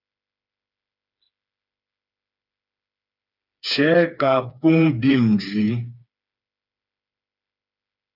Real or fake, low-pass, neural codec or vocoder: fake; 5.4 kHz; codec, 16 kHz, 4 kbps, FreqCodec, smaller model